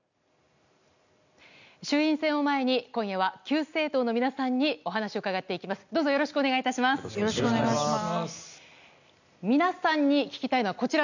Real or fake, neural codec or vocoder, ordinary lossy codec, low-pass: real; none; none; 7.2 kHz